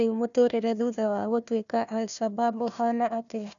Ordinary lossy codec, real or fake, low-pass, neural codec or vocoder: none; fake; 7.2 kHz; codec, 16 kHz, 1 kbps, FunCodec, trained on Chinese and English, 50 frames a second